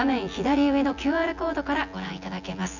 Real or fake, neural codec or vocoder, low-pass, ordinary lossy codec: fake; vocoder, 24 kHz, 100 mel bands, Vocos; 7.2 kHz; none